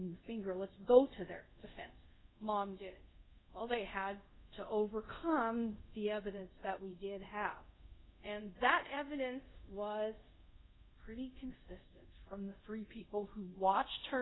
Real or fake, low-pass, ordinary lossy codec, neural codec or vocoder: fake; 7.2 kHz; AAC, 16 kbps; codec, 24 kHz, 0.5 kbps, DualCodec